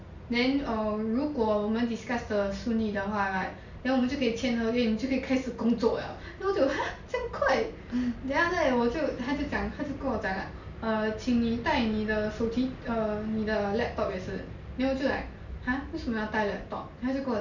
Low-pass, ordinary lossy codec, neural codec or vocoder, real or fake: 7.2 kHz; none; none; real